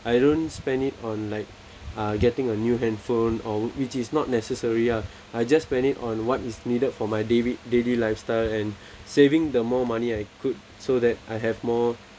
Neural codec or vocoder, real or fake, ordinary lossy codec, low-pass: none; real; none; none